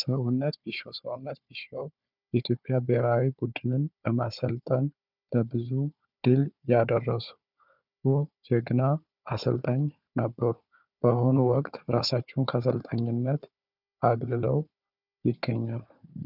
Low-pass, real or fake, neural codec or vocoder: 5.4 kHz; fake; codec, 16 kHz, 4 kbps, FunCodec, trained on Chinese and English, 50 frames a second